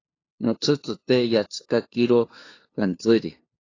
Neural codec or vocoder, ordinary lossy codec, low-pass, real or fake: codec, 16 kHz, 2 kbps, FunCodec, trained on LibriTTS, 25 frames a second; AAC, 32 kbps; 7.2 kHz; fake